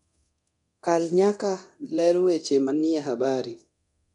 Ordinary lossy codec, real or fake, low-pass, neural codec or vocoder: none; fake; 10.8 kHz; codec, 24 kHz, 0.9 kbps, DualCodec